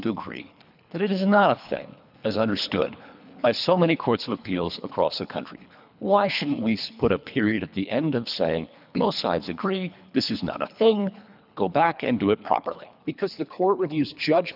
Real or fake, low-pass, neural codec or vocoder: fake; 5.4 kHz; codec, 24 kHz, 3 kbps, HILCodec